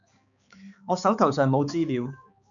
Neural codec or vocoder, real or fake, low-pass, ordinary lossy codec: codec, 16 kHz, 4 kbps, X-Codec, HuBERT features, trained on balanced general audio; fake; 7.2 kHz; MP3, 96 kbps